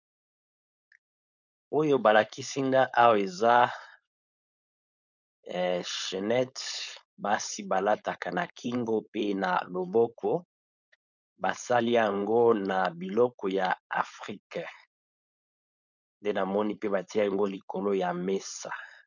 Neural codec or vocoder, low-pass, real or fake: codec, 16 kHz, 4.8 kbps, FACodec; 7.2 kHz; fake